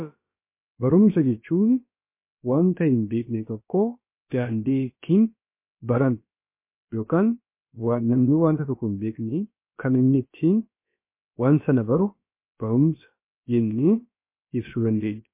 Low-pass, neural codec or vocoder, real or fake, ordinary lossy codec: 3.6 kHz; codec, 16 kHz, about 1 kbps, DyCAST, with the encoder's durations; fake; MP3, 24 kbps